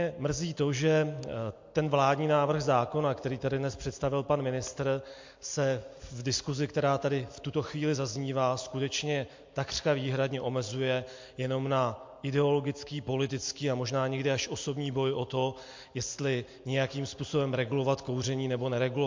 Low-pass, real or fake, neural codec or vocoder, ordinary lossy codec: 7.2 kHz; real; none; MP3, 48 kbps